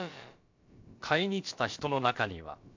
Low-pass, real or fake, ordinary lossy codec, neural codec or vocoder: 7.2 kHz; fake; MP3, 48 kbps; codec, 16 kHz, about 1 kbps, DyCAST, with the encoder's durations